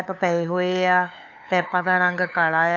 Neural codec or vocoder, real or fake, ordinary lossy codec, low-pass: codec, 16 kHz, 8 kbps, FunCodec, trained on LibriTTS, 25 frames a second; fake; AAC, 48 kbps; 7.2 kHz